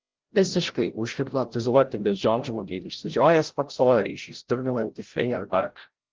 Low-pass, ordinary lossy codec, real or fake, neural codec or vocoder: 7.2 kHz; Opus, 16 kbps; fake; codec, 16 kHz, 0.5 kbps, FreqCodec, larger model